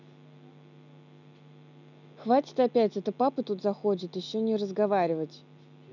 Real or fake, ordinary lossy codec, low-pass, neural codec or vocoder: real; none; 7.2 kHz; none